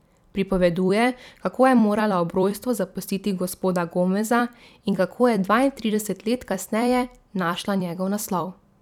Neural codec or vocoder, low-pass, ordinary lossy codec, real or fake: vocoder, 44.1 kHz, 128 mel bands every 256 samples, BigVGAN v2; 19.8 kHz; none; fake